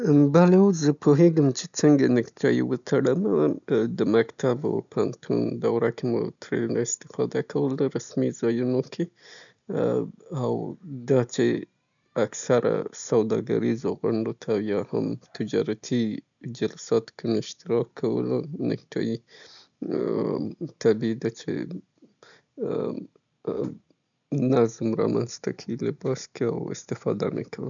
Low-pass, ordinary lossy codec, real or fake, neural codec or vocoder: 7.2 kHz; none; real; none